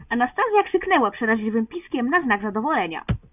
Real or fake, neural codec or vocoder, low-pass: real; none; 3.6 kHz